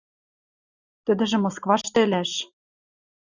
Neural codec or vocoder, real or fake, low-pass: none; real; 7.2 kHz